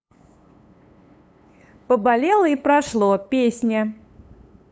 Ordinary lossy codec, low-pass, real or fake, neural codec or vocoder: none; none; fake; codec, 16 kHz, 8 kbps, FunCodec, trained on LibriTTS, 25 frames a second